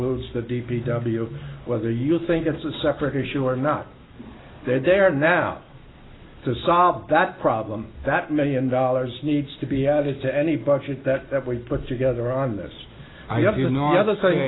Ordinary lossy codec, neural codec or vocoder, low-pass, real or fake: AAC, 16 kbps; none; 7.2 kHz; real